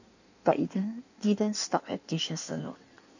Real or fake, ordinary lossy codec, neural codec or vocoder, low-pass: fake; none; codec, 16 kHz in and 24 kHz out, 1.1 kbps, FireRedTTS-2 codec; 7.2 kHz